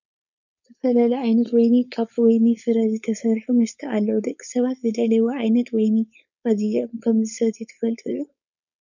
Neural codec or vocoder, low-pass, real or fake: codec, 16 kHz, 4.8 kbps, FACodec; 7.2 kHz; fake